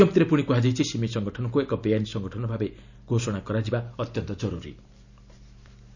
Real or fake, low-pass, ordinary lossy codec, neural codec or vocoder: real; 7.2 kHz; none; none